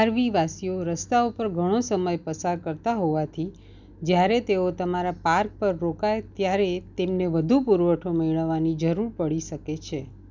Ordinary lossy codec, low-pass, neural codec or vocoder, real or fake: none; 7.2 kHz; none; real